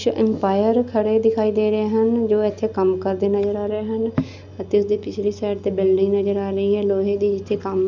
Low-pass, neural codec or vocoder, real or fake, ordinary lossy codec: 7.2 kHz; none; real; none